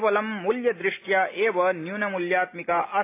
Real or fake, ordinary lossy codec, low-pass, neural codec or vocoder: real; AAC, 24 kbps; 3.6 kHz; none